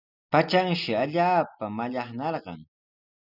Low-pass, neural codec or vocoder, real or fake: 5.4 kHz; none; real